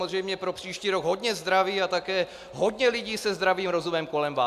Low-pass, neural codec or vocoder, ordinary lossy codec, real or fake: 14.4 kHz; none; MP3, 96 kbps; real